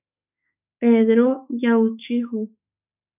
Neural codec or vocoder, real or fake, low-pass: autoencoder, 48 kHz, 32 numbers a frame, DAC-VAE, trained on Japanese speech; fake; 3.6 kHz